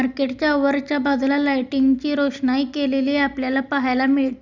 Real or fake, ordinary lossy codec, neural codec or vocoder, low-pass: real; Opus, 64 kbps; none; 7.2 kHz